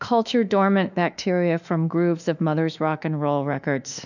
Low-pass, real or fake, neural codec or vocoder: 7.2 kHz; fake; autoencoder, 48 kHz, 32 numbers a frame, DAC-VAE, trained on Japanese speech